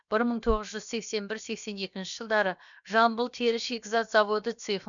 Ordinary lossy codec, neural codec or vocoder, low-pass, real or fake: none; codec, 16 kHz, about 1 kbps, DyCAST, with the encoder's durations; 7.2 kHz; fake